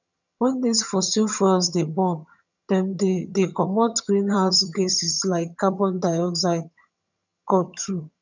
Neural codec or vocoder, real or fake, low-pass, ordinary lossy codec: vocoder, 22.05 kHz, 80 mel bands, HiFi-GAN; fake; 7.2 kHz; none